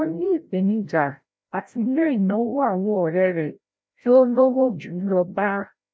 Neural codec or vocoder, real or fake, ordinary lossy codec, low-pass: codec, 16 kHz, 0.5 kbps, FreqCodec, larger model; fake; none; none